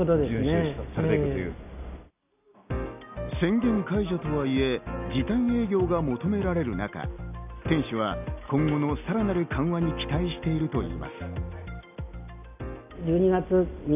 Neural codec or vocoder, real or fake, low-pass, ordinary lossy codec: none; real; 3.6 kHz; none